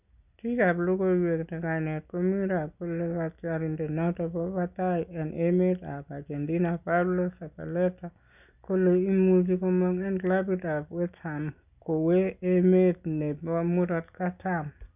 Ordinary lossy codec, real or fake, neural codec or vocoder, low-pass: none; real; none; 3.6 kHz